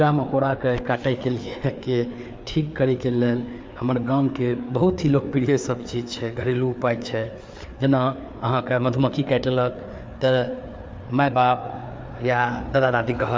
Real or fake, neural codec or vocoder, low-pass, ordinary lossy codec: fake; codec, 16 kHz, 4 kbps, FreqCodec, larger model; none; none